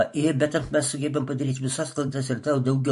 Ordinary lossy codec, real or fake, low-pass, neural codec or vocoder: MP3, 48 kbps; fake; 14.4 kHz; vocoder, 44.1 kHz, 128 mel bands every 512 samples, BigVGAN v2